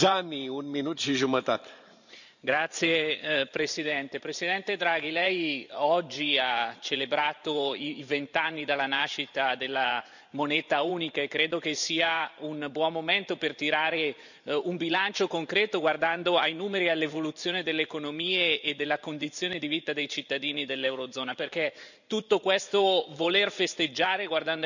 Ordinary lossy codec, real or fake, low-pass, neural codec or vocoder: none; fake; 7.2 kHz; vocoder, 44.1 kHz, 128 mel bands every 512 samples, BigVGAN v2